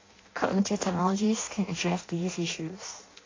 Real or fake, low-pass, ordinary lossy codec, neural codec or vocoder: fake; 7.2 kHz; AAC, 32 kbps; codec, 16 kHz in and 24 kHz out, 0.6 kbps, FireRedTTS-2 codec